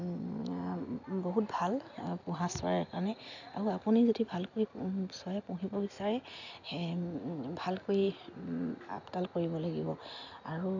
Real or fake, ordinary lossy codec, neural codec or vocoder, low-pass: real; none; none; 7.2 kHz